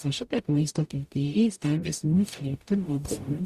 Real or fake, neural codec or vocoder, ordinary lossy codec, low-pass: fake; codec, 44.1 kHz, 0.9 kbps, DAC; Opus, 64 kbps; 14.4 kHz